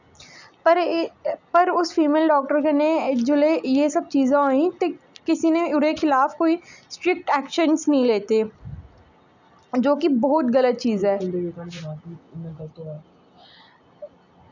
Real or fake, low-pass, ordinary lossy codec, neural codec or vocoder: real; 7.2 kHz; none; none